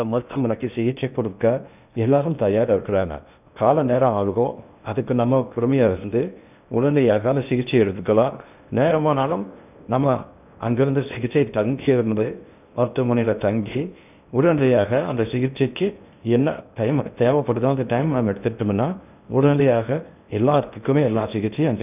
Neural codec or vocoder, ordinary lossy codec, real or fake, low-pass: codec, 16 kHz in and 24 kHz out, 0.6 kbps, FocalCodec, streaming, 2048 codes; none; fake; 3.6 kHz